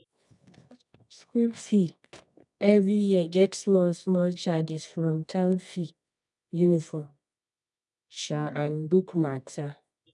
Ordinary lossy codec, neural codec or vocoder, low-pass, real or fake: none; codec, 24 kHz, 0.9 kbps, WavTokenizer, medium music audio release; 10.8 kHz; fake